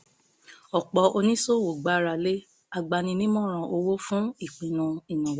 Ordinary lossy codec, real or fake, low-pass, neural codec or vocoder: none; real; none; none